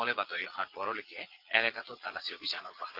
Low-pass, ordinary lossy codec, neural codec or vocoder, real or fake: 5.4 kHz; Opus, 16 kbps; vocoder, 22.05 kHz, 80 mel bands, Vocos; fake